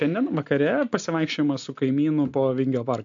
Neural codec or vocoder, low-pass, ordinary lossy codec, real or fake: none; 7.2 kHz; MP3, 64 kbps; real